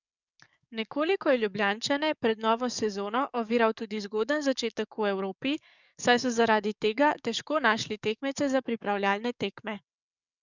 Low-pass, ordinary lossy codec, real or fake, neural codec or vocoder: 7.2 kHz; none; fake; codec, 44.1 kHz, 7.8 kbps, DAC